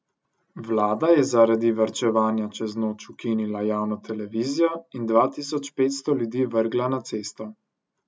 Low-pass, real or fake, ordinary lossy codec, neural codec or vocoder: none; real; none; none